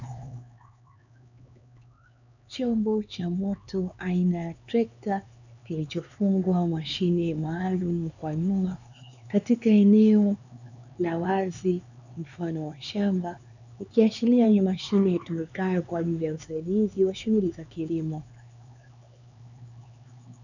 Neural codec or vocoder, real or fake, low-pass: codec, 16 kHz, 4 kbps, X-Codec, HuBERT features, trained on LibriSpeech; fake; 7.2 kHz